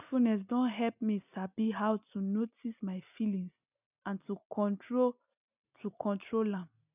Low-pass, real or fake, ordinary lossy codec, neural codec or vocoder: 3.6 kHz; real; none; none